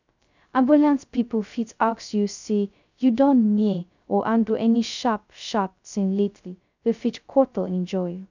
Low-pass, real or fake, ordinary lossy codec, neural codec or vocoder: 7.2 kHz; fake; none; codec, 16 kHz, 0.2 kbps, FocalCodec